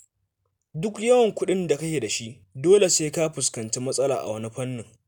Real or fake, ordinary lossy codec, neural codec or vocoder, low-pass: real; none; none; none